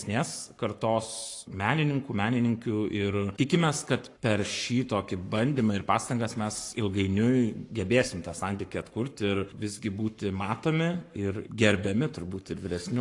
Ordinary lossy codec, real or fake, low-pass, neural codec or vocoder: AAC, 48 kbps; fake; 10.8 kHz; codec, 44.1 kHz, 7.8 kbps, Pupu-Codec